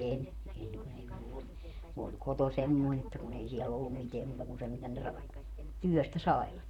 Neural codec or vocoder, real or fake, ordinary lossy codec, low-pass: vocoder, 44.1 kHz, 128 mel bands, Pupu-Vocoder; fake; none; 19.8 kHz